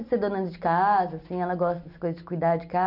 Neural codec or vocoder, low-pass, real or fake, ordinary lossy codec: none; 5.4 kHz; real; none